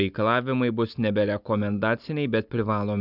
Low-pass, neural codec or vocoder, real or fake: 5.4 kHz; none; real